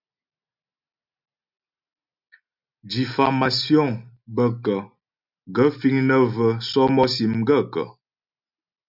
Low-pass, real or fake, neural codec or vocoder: 5.4 kHz; real; none